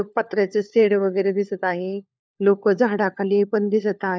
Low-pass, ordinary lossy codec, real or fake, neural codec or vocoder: none; none; fake; codec, 16 kHz, 4 kbps, FunCodec, trained on LibriTTS, 50 frames a second